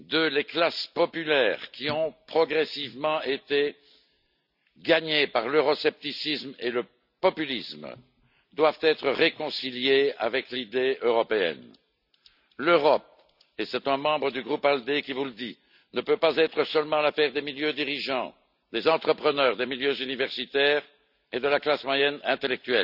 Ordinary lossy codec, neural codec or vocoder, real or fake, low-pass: none; none; real; 5.4 kHz